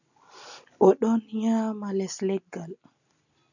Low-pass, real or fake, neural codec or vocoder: 7.2 kHz; real; none